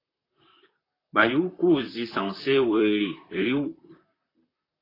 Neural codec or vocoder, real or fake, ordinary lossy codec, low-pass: vocoder, 44.1 kHz, 128 mel bands, Pupu-Vocoder; fake; AAC, 24 kbps; 5.4 kHz